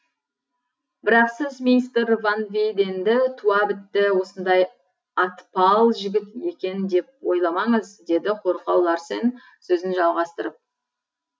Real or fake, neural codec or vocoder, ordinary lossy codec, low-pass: real; none; none; none